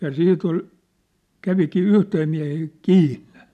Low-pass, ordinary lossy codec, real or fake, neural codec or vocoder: 14.4 kHz; MP3, 96 kbps; real; none